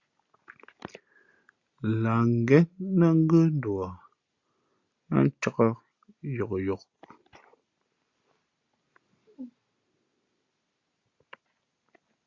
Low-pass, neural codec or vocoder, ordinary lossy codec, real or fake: 7.2 kHz; none; Opus, 64 kbps; real